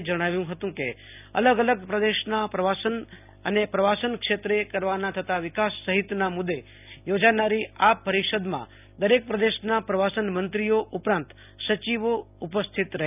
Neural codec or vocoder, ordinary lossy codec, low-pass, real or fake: none; none; 3.6 kHz; real